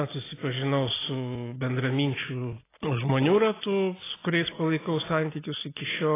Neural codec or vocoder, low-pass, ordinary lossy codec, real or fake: none; 3.6 kHz; AAC, 16 kbps; real